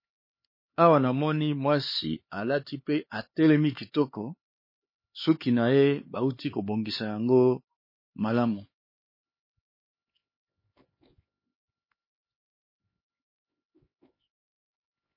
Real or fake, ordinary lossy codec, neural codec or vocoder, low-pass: fake; MP3, 24 kbps; codec, 16 kHz, 4 kbps, X-Codec, HuBERT features, trained on LibriSpeech; 5.4 kHz